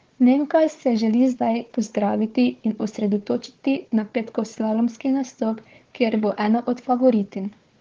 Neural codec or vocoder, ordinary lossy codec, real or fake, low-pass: codec, 16 kHz, 4 kbps, FunCodec, trained on Chinese and English, 50 frames a second; Opus, 16 kbps; fake; 7.2 kHz